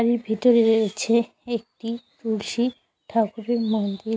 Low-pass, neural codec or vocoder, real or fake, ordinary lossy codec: none; none; real; none